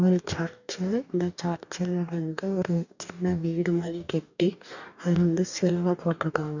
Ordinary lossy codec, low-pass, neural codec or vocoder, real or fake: none; 7.2 kHz; codec, 44.1 kHz, 2.6 kbps, DAC; fake